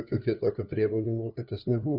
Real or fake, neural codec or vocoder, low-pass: fake; codec, 16 kHz, 2 kbps, FunCodec, trained on LibriTTS, 25 frames a second; 5.4 kHz